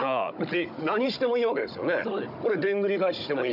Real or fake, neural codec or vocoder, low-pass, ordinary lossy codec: fake; codec, 16 kHz, 16 kbps, FunCodec, trained on Chinese and English, 50 frames a second; 5.4 kHz; none